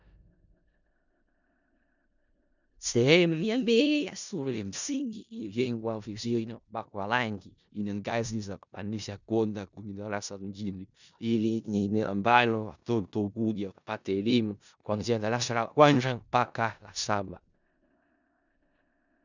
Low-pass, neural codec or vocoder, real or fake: 7.2 kHz; codec, 16 kHz in and 24 kHz out, 0.4 kbps, LongCat-Audio-Codec, four codebook decoder; fake